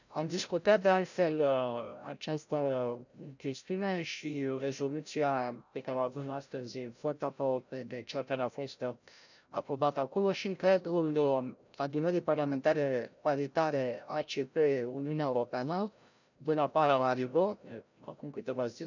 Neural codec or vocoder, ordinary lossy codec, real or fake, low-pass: codec, 16 kHz, 0.5 kbps, FreqCodec, larger model; none; fake; 7.2 kHz